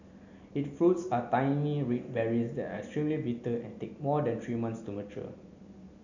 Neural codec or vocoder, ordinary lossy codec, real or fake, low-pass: none; none; real; 7.2 kHz